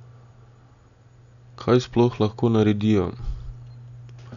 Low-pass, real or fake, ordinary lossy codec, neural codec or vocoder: 7.2 kHz; real; none; none